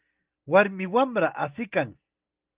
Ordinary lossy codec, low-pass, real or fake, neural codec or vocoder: Opus, 24 kbps; 3.6 kHz; real; none